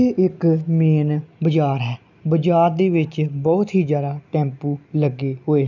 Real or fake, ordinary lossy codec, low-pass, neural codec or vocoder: real; none; 7.2 kHz; none